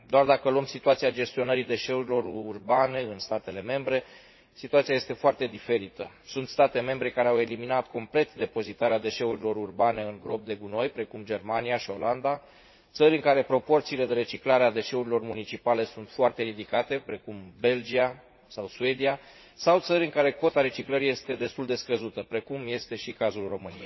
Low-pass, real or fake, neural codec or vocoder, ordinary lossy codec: 7.2 kHz; fake; vocoder, 44.1 kHz, 80 mel bands, Vocos; MP3, 24 kbps